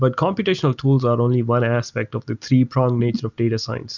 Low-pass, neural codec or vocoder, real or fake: 7.2 kHz; none; real